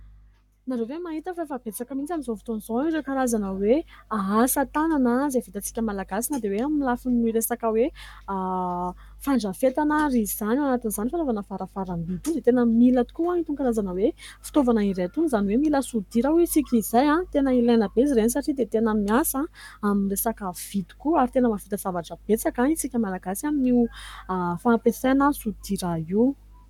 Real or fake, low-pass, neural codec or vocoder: fake; 19.8 kHz; codec, 44.1 kHz, 7.8 kbps, Pupu-Codec